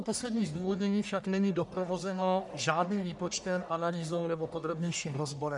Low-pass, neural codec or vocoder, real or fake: 10.8 kHz; codec, 44.1 kHz, 1.7 kbps, Pupu-Codec; fake